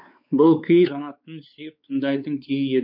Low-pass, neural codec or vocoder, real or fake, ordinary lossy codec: 5.4 kHz; codec, 16 kHz, 4 kbps, X-Codec, WavLM features, trained on Multilingual LibriSpeech; fake; MP3, 48 kbps